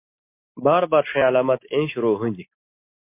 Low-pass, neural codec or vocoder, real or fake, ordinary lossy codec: 3.6 kHz; none; real; MP3, 24 kbps